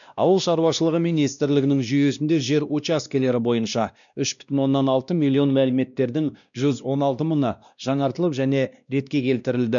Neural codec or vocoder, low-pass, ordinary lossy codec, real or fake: codec, 16 kHz, 1 kbps, X-Codec, WavLM features, trained on Multilingual LibriSpeech; 7.2 kHz; none; fake